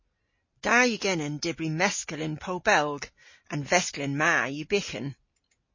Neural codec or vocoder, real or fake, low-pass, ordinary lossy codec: none; real; 7.2 kHz; MP3, 32 kbps